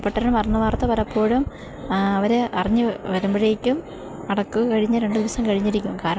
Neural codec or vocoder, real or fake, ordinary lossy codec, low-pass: none; real; none; none